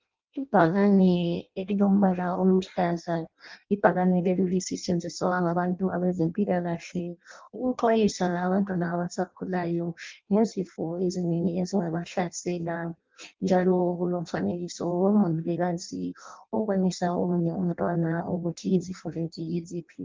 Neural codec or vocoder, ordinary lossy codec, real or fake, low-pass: codec, 16 kHz in and 24 kHz out, 0.6 kbps, FireRedTTS-2 codec; Opus, 24 kbps; fake; 7.2 kHz